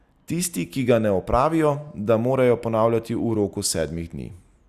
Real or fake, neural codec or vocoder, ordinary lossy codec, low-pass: real; none; Opus, 64 kbps; 14.4 kHz